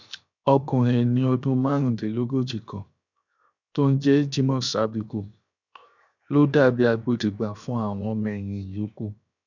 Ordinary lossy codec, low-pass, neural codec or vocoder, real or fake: none; 7.2 kHz; codec, 16 kHz, 0.7 kbps, FocalCodec; fake